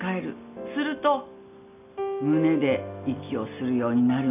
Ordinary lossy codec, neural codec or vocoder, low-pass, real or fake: none; none; 3.6 kHz; real